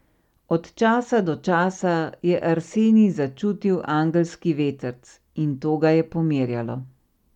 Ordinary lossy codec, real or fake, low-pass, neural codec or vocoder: none; real; 19.8 kHz; none